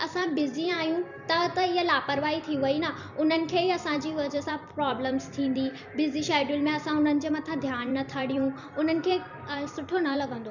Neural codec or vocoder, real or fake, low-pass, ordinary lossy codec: vocoder, 44.1 kHz, 128 mel bands every 256 samples, BigVGAN v2; fake; 7.2 kHz; none